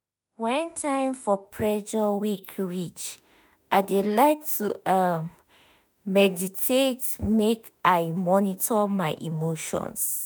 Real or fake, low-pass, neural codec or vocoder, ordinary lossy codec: fake; none; autoencoder, 48 kHz, 32 numbers a frame, DAC-VAE, trained on Japanese speech; none